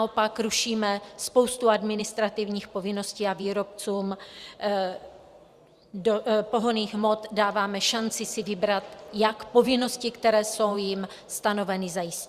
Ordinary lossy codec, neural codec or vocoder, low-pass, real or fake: Opus, 64 kbps; vocoder, 44.1 kHz, 128 mel bands every 512 samples, BigVGAN v2; 14.4 kHz; fake